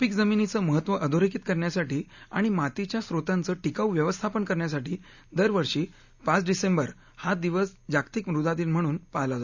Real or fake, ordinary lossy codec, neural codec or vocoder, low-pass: real; none; none; 7.2 kHz